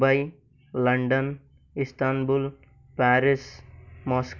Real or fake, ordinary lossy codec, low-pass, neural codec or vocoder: real; none; 7.2 kHz; none